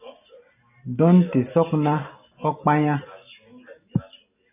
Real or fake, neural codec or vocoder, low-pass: real; none; 3.6 kHz